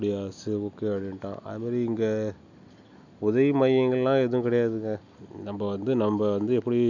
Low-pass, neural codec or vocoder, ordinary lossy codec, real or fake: 7.2 kHz; none; none; real